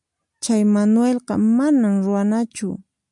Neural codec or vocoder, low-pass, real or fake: none; 10.8 kHz; real